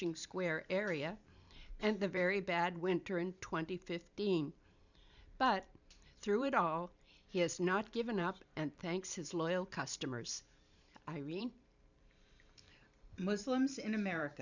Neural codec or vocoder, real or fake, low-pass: vocoder, 44.1 kHz, 128 mel bands every 512 samples, BigVGAN v2; fake; 7.2 kHz